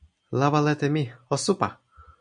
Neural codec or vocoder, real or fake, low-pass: none; real; 9.9 kHz